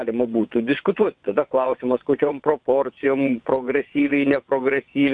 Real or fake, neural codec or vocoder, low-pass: fake; vocoder, 22.05 kHz, 80 mel bands, WaveNeXt; 9.9 kHz